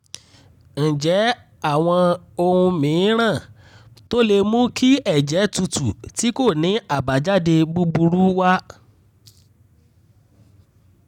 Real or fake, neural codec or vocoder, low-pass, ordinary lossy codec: real; none; 19.8 kHz; none